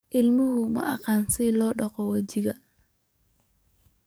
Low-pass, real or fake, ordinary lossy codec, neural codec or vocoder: none; fake; none; codec, 44.1 kHz, 7.8 kbps, DAC